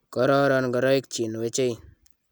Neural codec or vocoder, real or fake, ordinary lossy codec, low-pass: none; real; none; none